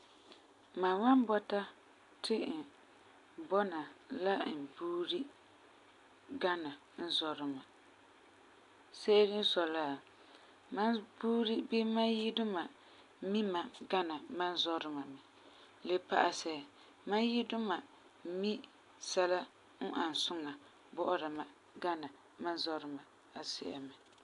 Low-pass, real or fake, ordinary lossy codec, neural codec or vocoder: 10.8 kHz; real; AAC, 64 kbps; none